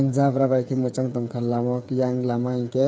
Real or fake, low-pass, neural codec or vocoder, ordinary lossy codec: fake; none; codec, 16 kHz, 8 kbps, FreqCodec, smaller model; none